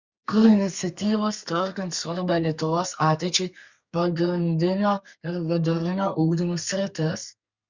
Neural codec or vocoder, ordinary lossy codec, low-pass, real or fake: codec, 32 kHz, 1.9 kbps, SNAC; Opus, 64 kbps; 7.2 kHz; fake